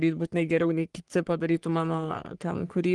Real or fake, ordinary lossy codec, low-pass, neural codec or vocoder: fake; Opus, 24 kbps; 10.8 kHz; codec, 44.1 kHz, 3.4 kbps, Pupu-Codec